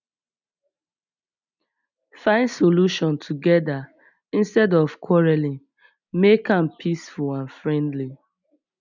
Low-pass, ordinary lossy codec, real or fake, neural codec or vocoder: 7.2 kHz; none; real; none